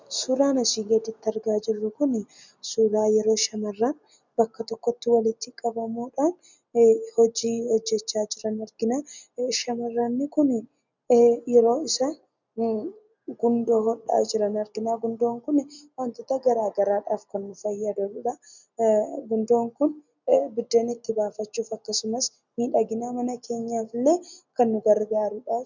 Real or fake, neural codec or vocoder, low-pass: real; none; 7.2 kHz